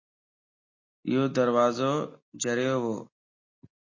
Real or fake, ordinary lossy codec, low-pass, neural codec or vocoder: real; MP3, 32 kbps; 7.2 kHz; none